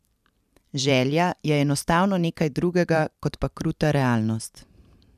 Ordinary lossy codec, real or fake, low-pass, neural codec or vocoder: none; fake; 14.4 kHz; vocoder, 44.1 kHz, 128 mel bands every 512 samples, BigVGAN v2